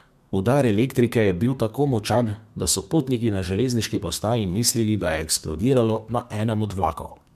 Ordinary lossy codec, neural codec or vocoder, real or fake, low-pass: MP3, 96 kbps; codec, 32 kHz, 1.9 kbps, SNAC; fake; 14.4 kHz